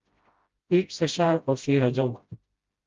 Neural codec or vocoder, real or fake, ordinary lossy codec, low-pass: codec, 16 kHz, 0.5 kbps, FreqCodec, smaller model; fake; Opus, 32 kbps; 7.2 kHz